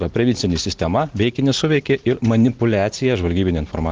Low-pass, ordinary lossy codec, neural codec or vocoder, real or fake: 7.2 kHz; Opus, 16 kbps; none; real